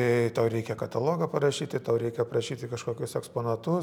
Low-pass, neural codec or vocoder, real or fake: 19.8 kHz; none; real